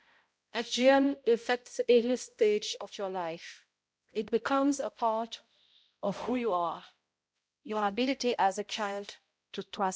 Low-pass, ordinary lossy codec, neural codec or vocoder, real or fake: none; none; codec, 16 kHz, 0.5 kbps, X-Codec, HuBERT features, trained on balanced general audio; fake